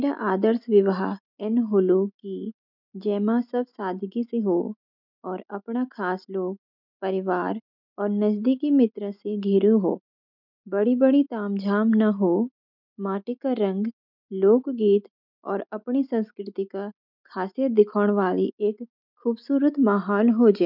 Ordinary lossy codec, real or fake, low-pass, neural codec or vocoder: none; real; 5.4 kHz; none